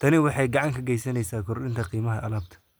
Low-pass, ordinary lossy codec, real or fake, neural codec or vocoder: none; none; real; none